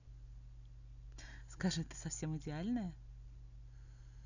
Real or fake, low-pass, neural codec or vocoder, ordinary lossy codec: real; 7.2 kHz; none; none